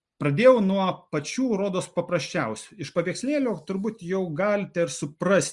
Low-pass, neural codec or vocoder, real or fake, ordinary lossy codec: 10.8 kHz; none; real; Opus, 64 kbps